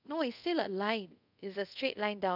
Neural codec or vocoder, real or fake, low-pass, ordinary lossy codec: codec, 24 kHz, 0.5 kbps, DualCodec; fake; 5.4 kHz; none